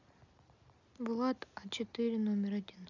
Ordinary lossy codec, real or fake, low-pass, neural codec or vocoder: none; real; 7.2 kHz; none